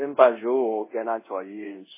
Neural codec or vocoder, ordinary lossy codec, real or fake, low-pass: codec, 24 kHz, 0.5 kbps, DualCodec; MP3, 16 kbps; fake; 3.6 kHz